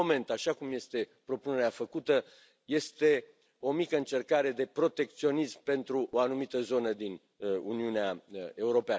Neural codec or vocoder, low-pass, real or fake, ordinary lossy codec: none; none; real; none